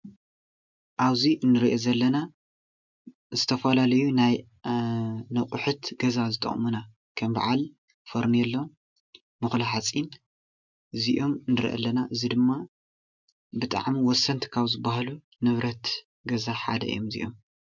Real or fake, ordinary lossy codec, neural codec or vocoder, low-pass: real; MP3, 64 kbps; none; 7.2 kHz